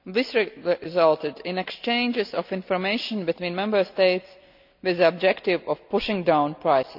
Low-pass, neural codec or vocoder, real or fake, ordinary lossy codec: 5.4 kHz; none; real; none